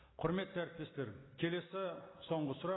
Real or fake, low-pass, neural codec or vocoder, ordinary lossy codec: real; 7.2 kHz; none; AAC, 16 kbps